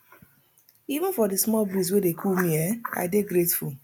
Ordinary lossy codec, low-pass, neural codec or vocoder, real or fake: none; none; none; real